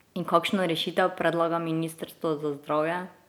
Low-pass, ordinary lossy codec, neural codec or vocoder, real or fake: none; none; none; real